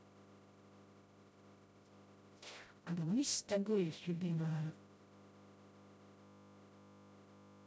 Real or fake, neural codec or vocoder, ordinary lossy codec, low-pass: fake; codec, 16 kHz, 0.5 kbps, FreqCodec, smaller model; none; none